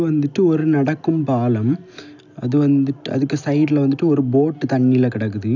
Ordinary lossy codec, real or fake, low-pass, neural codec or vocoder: none; real; 7.2 kHz; none